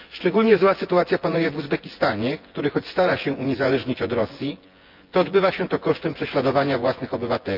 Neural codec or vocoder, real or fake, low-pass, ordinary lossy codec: vocoder, 24 kHz, 100 mel bands, Vocos; fake; 5.4 kHz; Opus, 24 kbps